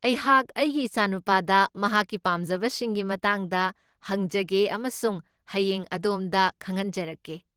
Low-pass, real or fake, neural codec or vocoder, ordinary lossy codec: 19.8 kHz; fake; vocoder, 44.1 kHz, 128 mel bands every 512 samples, BigVGAN v2; Opus, 16 kbps